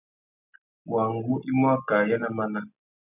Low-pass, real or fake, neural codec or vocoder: 3.6 kHz; real; none